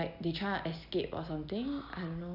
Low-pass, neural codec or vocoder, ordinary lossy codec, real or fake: 5.4 kHz; none; none; real